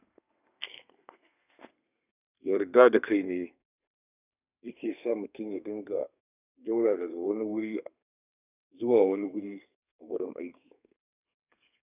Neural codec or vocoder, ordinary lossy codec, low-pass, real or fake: codec, 32 kHz, 1.9 kbps, SNAC; none; 3.6 kHz; fake